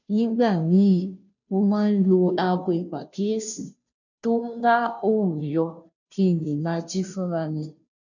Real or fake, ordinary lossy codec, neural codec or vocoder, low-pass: fake; none; codec, 16 kHz, 0.5 kbps, FunCodec, trained on Chinese and English, 25 frames a second; 7.2 kHz